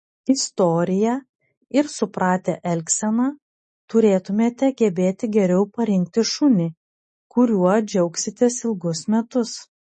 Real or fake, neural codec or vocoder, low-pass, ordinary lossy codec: real; none; 10.8 kHz; MP3, 32 kbps